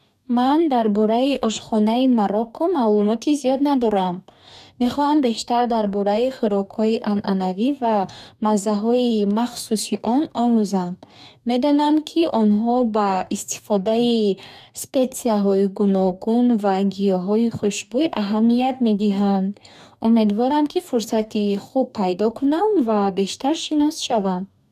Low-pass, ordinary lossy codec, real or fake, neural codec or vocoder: 14.4 kHz; none; fake; codec, 44.1 kHz, 2.6 kbps, DAC